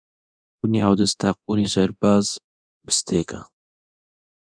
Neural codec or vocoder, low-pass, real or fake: codec, 24 kHz, 0.9 kbps, DualCodec; 9.9 kHz; fake